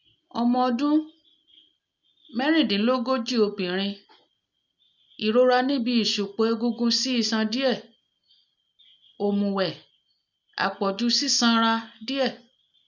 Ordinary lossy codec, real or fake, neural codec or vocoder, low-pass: none; real; none; 7.2 kHz